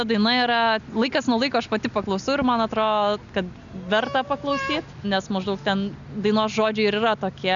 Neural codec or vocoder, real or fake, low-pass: none; real; 7.2 kHz